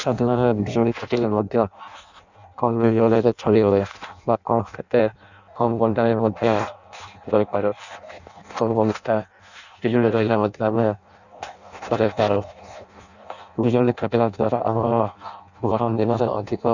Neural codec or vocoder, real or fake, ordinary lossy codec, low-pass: codec, 16 kHz in and 24 kHz out, 0.6 kbps, FireRedTTS-2 codec; fake; none; 7.2 kHz